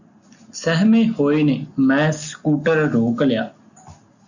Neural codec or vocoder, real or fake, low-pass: none; real; 7.2 kHz